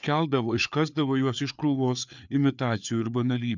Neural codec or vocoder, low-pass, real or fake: codec, 16 kHz, 4 kbps, FreqCodec, larger model; 7.2 kHz; fake